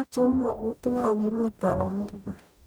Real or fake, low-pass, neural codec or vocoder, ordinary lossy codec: fake; none; codec, 44.1 kHz, 0.9 kbps, DAC; none